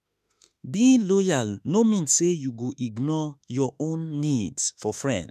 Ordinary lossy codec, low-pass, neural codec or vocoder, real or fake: none; 14.4 kHz; autoencoder, 48 kHz, 32 numbers a frame, DAC-VAE, trained on Japanese speech; fake